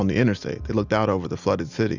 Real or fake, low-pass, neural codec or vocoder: real; 7.2 kHz; none